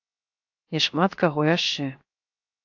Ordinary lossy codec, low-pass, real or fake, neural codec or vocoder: AAC, 48 kbps; 7.2 kHz; fake; codec, 16 kHz, 0.7 kbps, FocalCodec